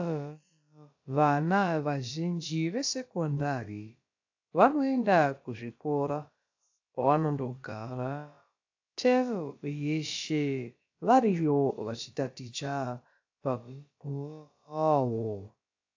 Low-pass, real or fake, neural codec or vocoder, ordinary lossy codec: 7.2 kHz; fake; codec, 16 kHz, about 1 kbps, DyCAST, with the encoder's durations; AAC, 48 kbps